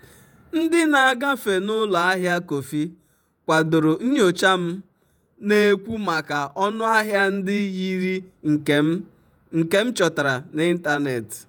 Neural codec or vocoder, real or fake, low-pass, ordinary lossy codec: vocoder, 48 kHz, 128 mel bands, Vocos; fake; none; none